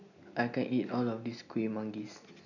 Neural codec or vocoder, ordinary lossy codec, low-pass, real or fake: none; none; 7.2 kHz; real